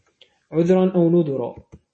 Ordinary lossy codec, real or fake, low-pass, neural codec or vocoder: MP3, 32 kbps; real; 10.8 kHz; none